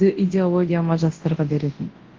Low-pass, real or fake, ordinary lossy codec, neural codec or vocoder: 7.2 kHz; fake; Opus, 16 kbps; codec, 24 kHz, 0.9 kbps, WavTokenizer, large speech release